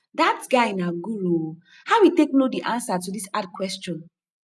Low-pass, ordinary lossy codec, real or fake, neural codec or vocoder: none; none; real; none